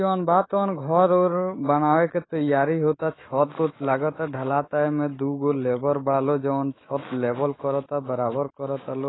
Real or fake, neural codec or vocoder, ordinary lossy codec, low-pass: fake; autoencoder, 48 kHz, 128 numbers a frame, DAC-VAE, trained on Japanese speech; AAC, 16 kbps; 7.2 kHz